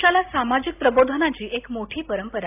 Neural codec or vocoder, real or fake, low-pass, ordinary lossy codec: none; real; 3.6 kHz; AAC, 32 kbps